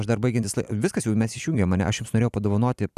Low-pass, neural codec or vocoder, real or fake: 14.4 kHz; vocoder, 44.1 kHz, 128 mel bands every 256 samples, BigVGAN v2; fake